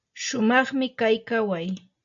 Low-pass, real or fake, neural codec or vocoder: 7.2 kHz; real; none